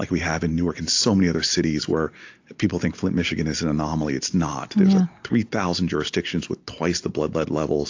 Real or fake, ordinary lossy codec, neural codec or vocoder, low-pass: real; AAC, 48 kbps; none; 7.2 kHz